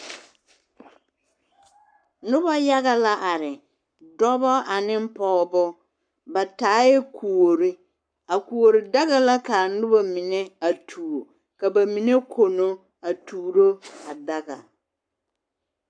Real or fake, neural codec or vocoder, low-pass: fake; codec, 44.1 kHz, 7.8 kbps, Pupu-Codec; 9.9 kHz